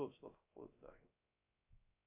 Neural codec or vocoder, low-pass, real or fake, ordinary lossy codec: codec, 16 kHz, 0.3 kbps, FocalCodec; 3.6 kHz; fake; MP3, 32 kbps